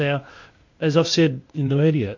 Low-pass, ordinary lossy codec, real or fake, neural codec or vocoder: 7.2 kHz; MP3, 48 kbps; fake; codec, 16 kHz, 0.8 kbps, ZipCodec